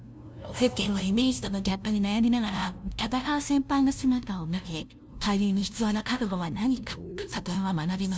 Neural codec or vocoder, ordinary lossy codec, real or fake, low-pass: codec, 16 kHz, 0.5 kbps, FunCodec, trained on LibriTTS, 25 frames a second; none; fake; none